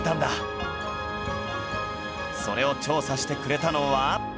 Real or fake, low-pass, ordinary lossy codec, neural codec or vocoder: real; none; none; none